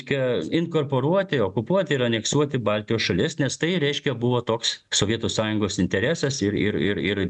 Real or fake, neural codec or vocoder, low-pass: real; none; 10.8 kHz